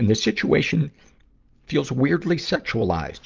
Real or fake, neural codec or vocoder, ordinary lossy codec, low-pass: real; none; Opus, 24 kbps; 7.2 kHz